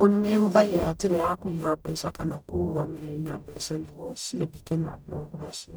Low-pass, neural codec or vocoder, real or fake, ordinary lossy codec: none; codec, 44.1 kHz, 0.9 kbps, DAC; fake; none